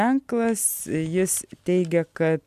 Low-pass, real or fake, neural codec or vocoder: 14.4 kHz; real; none